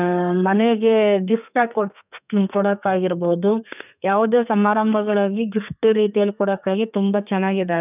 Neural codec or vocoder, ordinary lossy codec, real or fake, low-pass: codec, 32 kHz, 1.9 kbps, SNAC; none; fake; 3.6 kHz